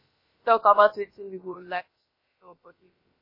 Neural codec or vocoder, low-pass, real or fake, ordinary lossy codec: codec, 16 kHz, about 1 kbps, DyCAST, with the encoder's durations; 5.4 kHz; fake; MP3, 24 kbps